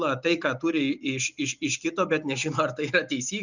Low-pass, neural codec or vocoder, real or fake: 7.2 kHz; none; real